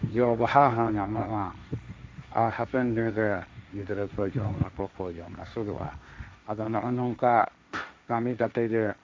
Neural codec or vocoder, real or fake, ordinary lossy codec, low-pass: codec, 16 kHz, 1.1 kbps, Voila-Tokenizer; fake; none; none